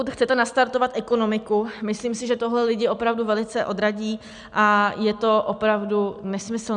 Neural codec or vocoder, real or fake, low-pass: none; real; 9.9 kHz